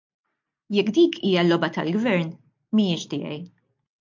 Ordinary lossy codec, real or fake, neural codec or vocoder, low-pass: MP3, 48 kbps; real; none; 7.2 kHz